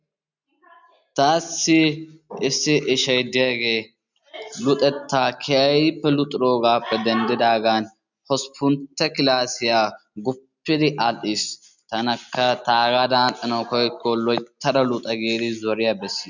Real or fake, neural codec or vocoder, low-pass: real; none; 7.2 kHz